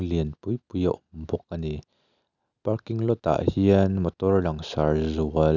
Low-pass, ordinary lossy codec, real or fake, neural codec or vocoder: 7.2 kHz; none; real; none